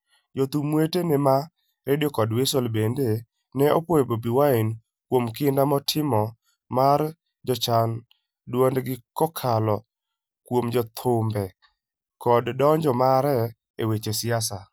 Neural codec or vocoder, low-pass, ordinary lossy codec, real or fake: none; none; none; real